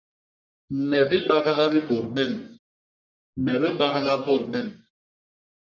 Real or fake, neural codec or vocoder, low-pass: fake; codec, 44.1 kHz, 1.7 kbps, Pupu-Codec; 7.2 kHz